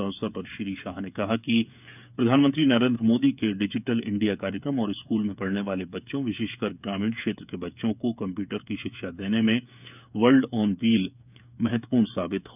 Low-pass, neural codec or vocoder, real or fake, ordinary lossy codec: 3.6 kHz; codec, 16 kHz, 8 kbps, FreqCodec, smaller model; fake; none